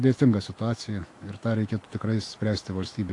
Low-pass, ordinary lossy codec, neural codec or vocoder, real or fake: 10.8 kHz; AAC, 48 kbps; autoencoder, 48 kHz, 128 numbers a frame, DAC-VAE, trained on Japanese speech; fake